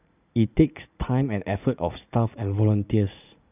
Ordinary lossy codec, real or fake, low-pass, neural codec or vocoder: none; fake; 3.6 kHz; vocoder, 44.1 kHz, 80 mel bands, Vocos